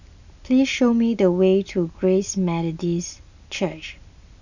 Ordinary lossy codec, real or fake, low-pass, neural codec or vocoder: none; real; 7.2 kHz; none